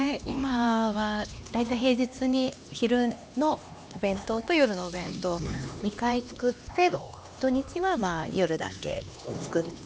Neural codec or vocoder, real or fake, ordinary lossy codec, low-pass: codec, 16 kHz, 2 kbps, X-Codec, HuBERT features, trained on LibriSpeech; fake; none; none